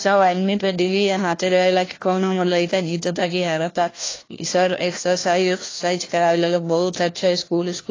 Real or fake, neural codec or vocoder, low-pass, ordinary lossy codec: fake; codec, 16 kHz, 1 kbps, FunCodec, trained on LibriTTS, 50 frames a second; 7.2 kHz; AAC, 32 kbps